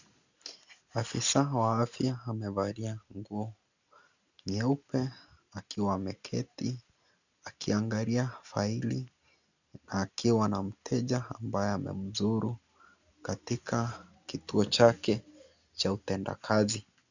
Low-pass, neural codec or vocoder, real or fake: 7.2 kHz; none; real